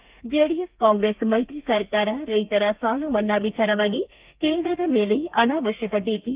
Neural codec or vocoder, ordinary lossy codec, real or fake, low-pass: codec, 32 kHz, 1.9 kbps, SNAC; Opus, 32 kbps; fake; 3.6 kHz